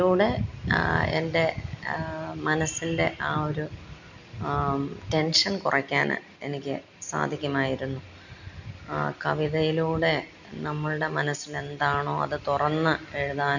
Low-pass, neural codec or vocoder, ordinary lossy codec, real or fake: 7.2 kHz; none; none; real